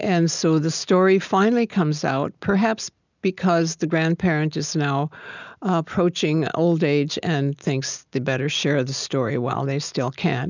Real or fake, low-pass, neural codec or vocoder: real; 7.2 kHz; none